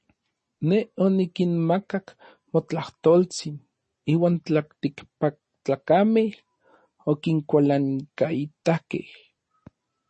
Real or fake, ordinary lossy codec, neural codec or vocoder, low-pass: real; MP3, 32 kbps; none; 10.8 kHz